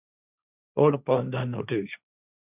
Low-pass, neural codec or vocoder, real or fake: 3.6 kHz; codec, 16 kHz, 1.1 kbps, Voila-Tokenizer; fake